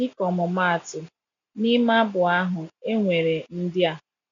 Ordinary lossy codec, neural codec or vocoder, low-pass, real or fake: MP3, 64 kbps; none; 7.2 kHz; real